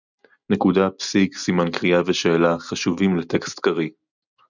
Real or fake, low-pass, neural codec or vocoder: real; 7.2 kHz; none